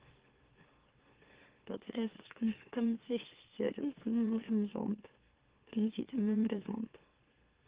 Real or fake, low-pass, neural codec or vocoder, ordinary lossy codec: fake; 3.6 kHz; autoencoder, 44.1 kHz, a latent of 192 numbers a frame, MeloTTS; Opus, 16 kbps